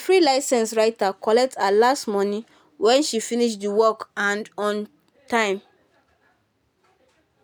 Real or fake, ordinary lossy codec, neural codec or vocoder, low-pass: real; none; none; none